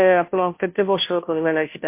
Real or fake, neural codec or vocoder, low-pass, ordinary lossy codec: fake; codec, 16 kHz, 0.5 kbps, FunCodec, trained on Chinese and English, 25 frames a second; 3.6 kHz; MP3, 24 kbps